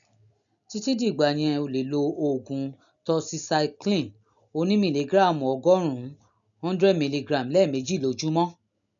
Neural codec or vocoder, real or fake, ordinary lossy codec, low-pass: none; real; none; 7.2 kHz